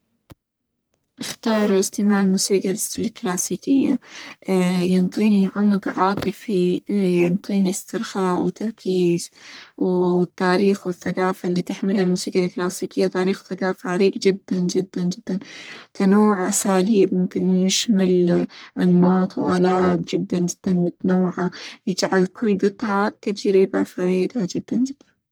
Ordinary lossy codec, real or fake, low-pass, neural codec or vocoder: none; fake; none; codec, 44.1 kHz, 1.7 kbps, Pupu-Codec